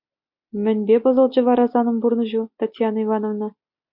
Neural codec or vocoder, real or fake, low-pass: none; real; 5.4 kHz